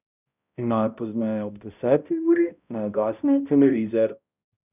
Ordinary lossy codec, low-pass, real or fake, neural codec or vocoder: none; 3.6 kHz; fake; codec, 16 kHz, 0.5 kbps, X-Codec, HuBERT features, trained on balanced general audio